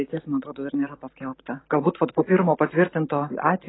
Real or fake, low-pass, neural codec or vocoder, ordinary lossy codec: real; 7.2 kHz; none; AAC, 16 kbps